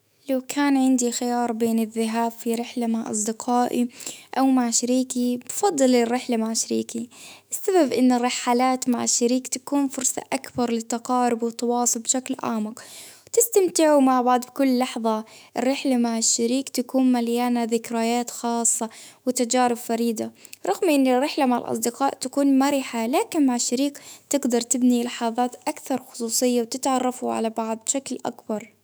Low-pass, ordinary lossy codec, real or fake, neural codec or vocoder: none; none; fake; autoencoder, 48 kHz, 128 numbers a frame, DAC-VAE, trained on Japanese speech